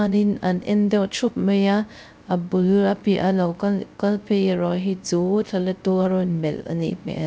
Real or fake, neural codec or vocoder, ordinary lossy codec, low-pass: fake; codec, 16 kHz, 0.3 kbps, FocalCodec; none; none